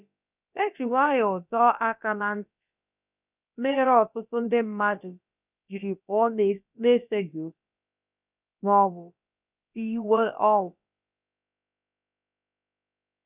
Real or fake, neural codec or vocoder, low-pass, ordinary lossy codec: fake; codec, 16 kHz, about 1 kbps, DyCAST, with the encoder's durations; 3.6 kHz; none